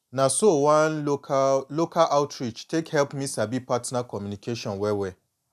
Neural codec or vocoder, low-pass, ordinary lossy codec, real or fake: none; 14.4 kHz; none; real